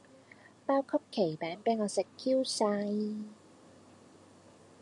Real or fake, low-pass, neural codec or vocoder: real; 10.8 kHz; none